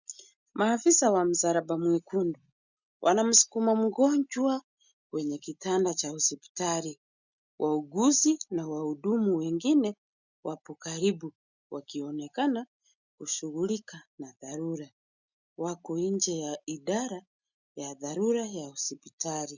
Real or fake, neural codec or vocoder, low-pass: real; none; 7.2 kHz